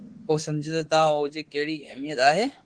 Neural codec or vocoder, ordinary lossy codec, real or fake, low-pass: autoencoder, 48 kHz, 32 numbers a frame, DAC-VAE, trained on Japanese speech; Opus, 16 kbps; fake; 9.9 kHz